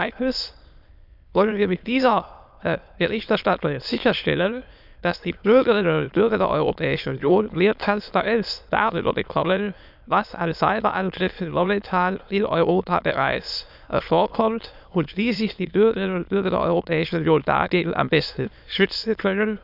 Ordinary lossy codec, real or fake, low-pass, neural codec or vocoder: none; fake; 5.4 kHz; autoencoder, 22.05 kHz, a latent of 192 numbers a frame, VITS, trained on many speakers